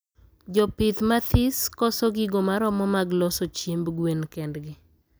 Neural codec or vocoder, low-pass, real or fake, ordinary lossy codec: none; none; real; none